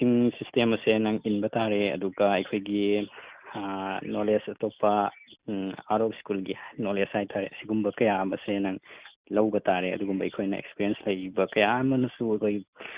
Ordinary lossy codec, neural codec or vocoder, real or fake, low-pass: Opus, 64 kbps; none; real; 3.6 kHz